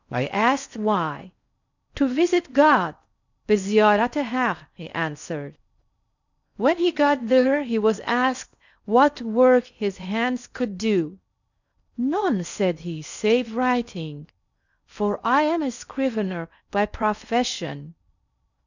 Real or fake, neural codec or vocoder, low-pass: fake; codec, 16 kHz in and 24 kHz out, 0.6 kbps, FocalCodec, streaming, 4096 codes; 7.2 kHz